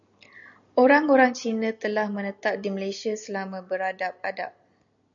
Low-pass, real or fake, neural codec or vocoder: 7.2 kHz; real; none